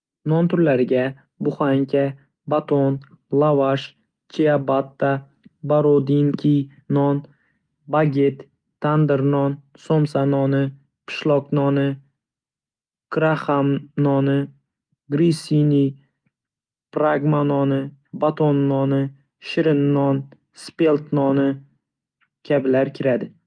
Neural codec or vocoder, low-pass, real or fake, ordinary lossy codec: none; 9.9 kHz; real; Opus, 32 kbps